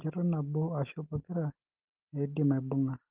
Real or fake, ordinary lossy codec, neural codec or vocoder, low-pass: real; Opus, 16 kbps; none; 3.6 kHz